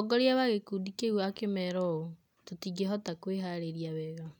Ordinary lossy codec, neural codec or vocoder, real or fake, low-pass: none; none; real; 19.8 kHz